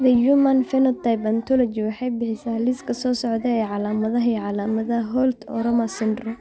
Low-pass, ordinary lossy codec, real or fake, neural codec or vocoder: none; none; real; none